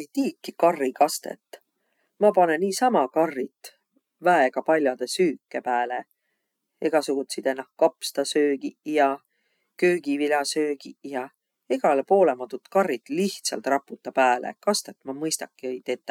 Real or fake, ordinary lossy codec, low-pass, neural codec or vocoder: real; none; 19.8 kHz; none